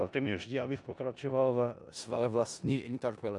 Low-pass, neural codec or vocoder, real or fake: 10.8 kHz; codec, 16 kHz in and 24 kHz out, 0.4 kbps, LongCat-Audio-Codec, four codebook decoder; fake